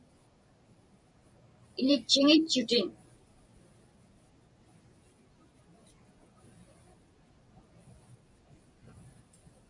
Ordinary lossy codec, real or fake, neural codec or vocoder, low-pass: MP3, 96 kbps; fake; vocoder, 44.1 kHz, 128 mel bands every 256 samples, BigVGAN v2; 10.8 kHz